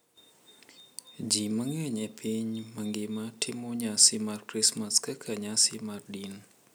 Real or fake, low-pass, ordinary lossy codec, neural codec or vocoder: real; none; none; none